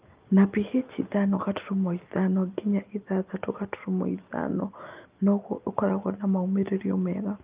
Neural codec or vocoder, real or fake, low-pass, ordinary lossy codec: none; real; 3.6 kHz; Opus, 24 kbps